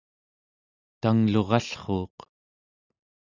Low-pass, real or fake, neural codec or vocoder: 7.2 kHz; real; none